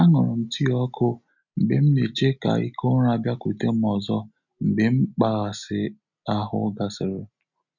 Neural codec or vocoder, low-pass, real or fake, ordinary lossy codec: none; 7.2 kHz; real; none